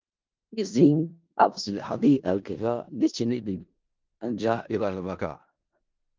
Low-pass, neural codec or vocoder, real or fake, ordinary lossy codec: 7.2 kHz; codec, 16 kHz in and 24 kHz out, 0.4 kbps, LongCat-Audio-Codec, four codebook decoder; fake; Opus, 32 kbps